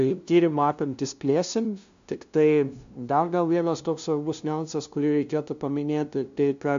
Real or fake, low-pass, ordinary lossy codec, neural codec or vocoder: fake; 7.2 kHz; MP3, 64 kbps; codec, 16 kHz, 0.5 kbps, FunCodec, trained on LibriTTS, 25 frames a second